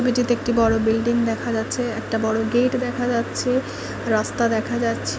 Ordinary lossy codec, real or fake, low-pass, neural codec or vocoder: none; real; none; none